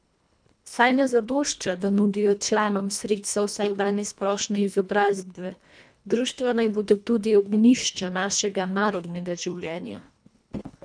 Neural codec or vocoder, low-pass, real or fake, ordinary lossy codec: codec, 24 kHz, 1.5 kbps, HILCodec; 9.9 kHz; fake; none